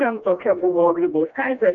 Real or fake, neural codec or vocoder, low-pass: fake; codec, 16 kHz, 1 kbps, FreqCodec, smaller model; 7.2 kHz